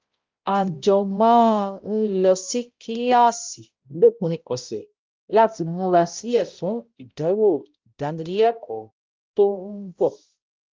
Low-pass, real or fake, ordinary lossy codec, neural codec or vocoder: 7.2 kHz; fake; Opus, 24 kbps; codec, 16 kHz, 0.5 kbps, X-Codec, HuBERT features, trained on balanced general audio